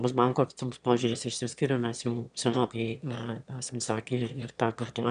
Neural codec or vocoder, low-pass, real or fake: autoencoder, 22.05 kHz, a latent of 192 numbers a frame, VITS, trained on one speaker; 9.9 kHz; fake